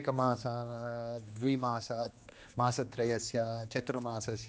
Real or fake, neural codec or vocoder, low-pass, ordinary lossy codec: fake; codec, 16 kHz, 2 kbps, X-Codec, HuBERT features, trained on general audio; none; none